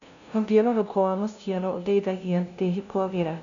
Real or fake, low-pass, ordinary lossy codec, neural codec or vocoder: fake; 7.2 kHz; none; codec, 16 kHz, 0.5 kbps, FunCodec, trained on LibriTTS, 25 frames a second